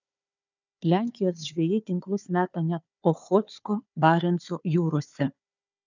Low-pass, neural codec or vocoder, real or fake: 7.2 kHz; codec, 16 kHz, 4 kbps, FunCodec, trained on Chinese and English, 50 frames a second; fake